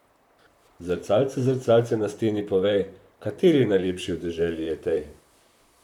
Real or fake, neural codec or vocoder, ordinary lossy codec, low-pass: fake; vocoder, 44.1 kHz, 128 mel bands, Pupu-Vocoder; none; 19.8 kHz